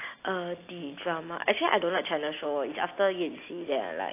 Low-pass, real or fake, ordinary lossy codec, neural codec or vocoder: 3.6 kHz; real; AAC, 24 kbps; none